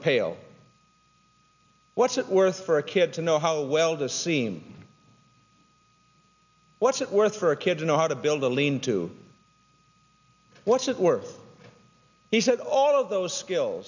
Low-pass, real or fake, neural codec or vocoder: 7.2 kHz; real; none